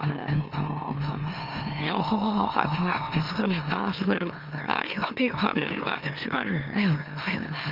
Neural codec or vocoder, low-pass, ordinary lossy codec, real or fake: autoencoder, 44.1 kHz, a latent of 192 numbers a frame, MeloTTS; 5.4 kHz; Opus, 24 kbps; fake